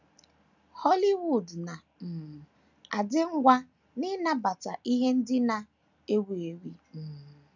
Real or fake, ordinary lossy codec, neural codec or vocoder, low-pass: real; none; none; 7.2 kHz